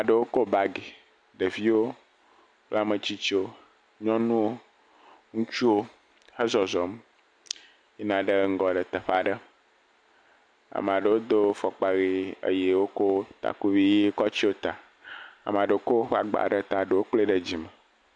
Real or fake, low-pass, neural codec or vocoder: real; 9.9 kHz; none